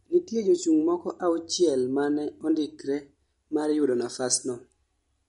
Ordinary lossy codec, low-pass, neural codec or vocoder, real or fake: MP3, 48 kbps; 14.4 kHz; none; real